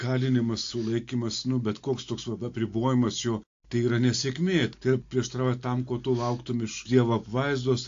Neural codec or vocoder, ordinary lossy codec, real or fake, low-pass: none; AAC, 48 kbps; real; 7.2 kHz